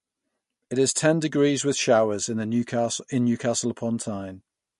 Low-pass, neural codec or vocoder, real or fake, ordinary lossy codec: 14.4 kHz; none; real; MP3, 48 kbps